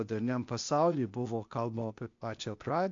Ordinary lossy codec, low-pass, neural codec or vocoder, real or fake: MP3, 48 kbps; 7.2 kHz; codec, 16 kHz, 0.8 kbps, ZipCodec; fake